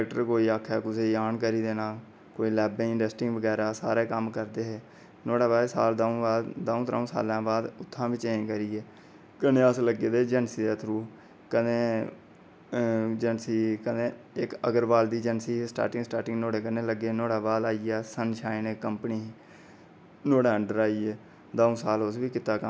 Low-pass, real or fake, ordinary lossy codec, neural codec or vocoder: none; real; none; none